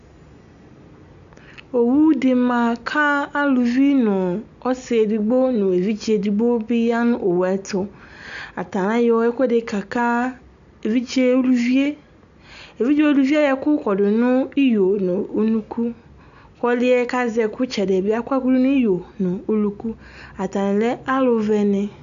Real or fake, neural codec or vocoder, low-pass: real; none; 7.2 kHz